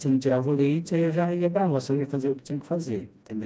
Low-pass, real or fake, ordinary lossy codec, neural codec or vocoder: none; fake; none; codec, 16 kHz, 1 kbps, FreqCodec, smaller model